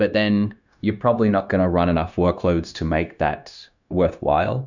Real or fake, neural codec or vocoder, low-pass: fake; codec, 16 kHz, 0.9 kbps, LongCat-Audio-Codec; 7.2 kHz